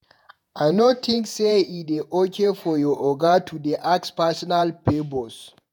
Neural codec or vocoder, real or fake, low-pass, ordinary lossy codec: vocoder, 48 kHz, 128 mel bands, Vocos; fake; 19.8 kHz; none